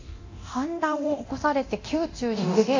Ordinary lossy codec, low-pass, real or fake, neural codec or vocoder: AAC, 48 kbps; 7.2 kHz; fake; codec, 24 kHz, 0.9 kbps, DualCodec